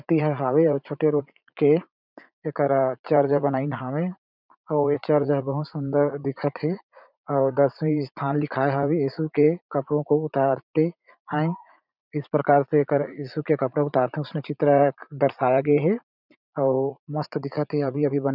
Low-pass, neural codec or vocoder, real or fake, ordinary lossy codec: 5.4 kHz; vocoder, 44.1 kHz, 80 mel bands, Vocos; fake; none